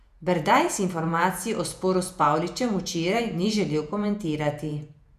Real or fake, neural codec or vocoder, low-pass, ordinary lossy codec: fake; vocoder, 48 kHz, 128 mel bands, Vocos; 14.4 kHz; none